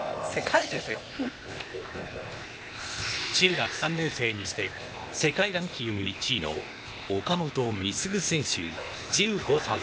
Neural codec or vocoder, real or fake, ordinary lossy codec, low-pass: codec, 16 kHz, 0.8 kbps, ZipCodec; fake; none; none